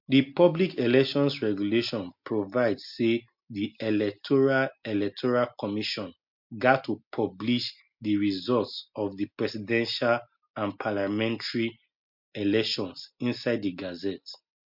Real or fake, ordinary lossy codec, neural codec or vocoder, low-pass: real; MP3, 48 kbps; none; 5.4 kHz